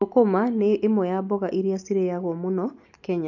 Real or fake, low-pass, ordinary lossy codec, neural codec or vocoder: real; 7.2 kHz; none; none